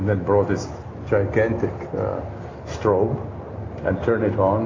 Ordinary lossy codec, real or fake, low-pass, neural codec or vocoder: AAC, 32 kbps; real; 7.2 kHz; none